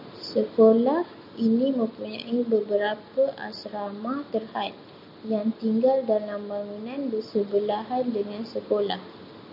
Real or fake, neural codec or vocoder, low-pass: real; none; 5.4 kHz